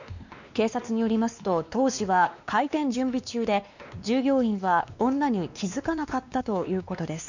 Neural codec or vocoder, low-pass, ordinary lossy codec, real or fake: codec, 16 kHz, 2 kbps, X-Codec, WavLM features, trained on Multilingual LibriSpeech; 7.2 kHz; none; fake